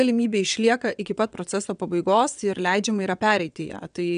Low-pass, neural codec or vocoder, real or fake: 9.9 kHz; vocoder, 22.05 kHz, 80 mel bands, WaveNeXt; fake